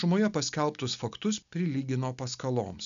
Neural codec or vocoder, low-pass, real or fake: none; 7.2 kHz; real